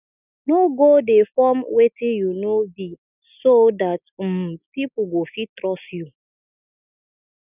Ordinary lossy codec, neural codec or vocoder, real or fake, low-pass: none; none; real; 3.6 kHz